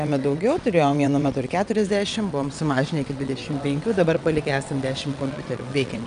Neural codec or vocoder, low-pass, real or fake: vocoder, 22.05 kHz, 80 mel bands, WaveNeXt; 9.9 kHz; fake